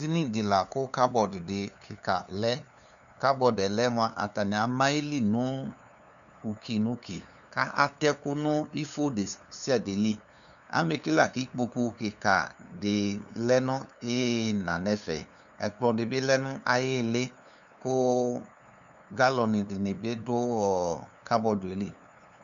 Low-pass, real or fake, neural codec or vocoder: 7.2 kHz; fake; codec, 16 kHz, 2 kbps, FunCodec, trained on LibriTTS, 25 frames a second